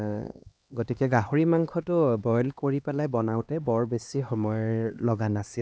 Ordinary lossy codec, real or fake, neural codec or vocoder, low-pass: none; fake; codec, 16 kHz, 2 kbps, X-Codec, HuBERT features, trained on LibriSpeech; none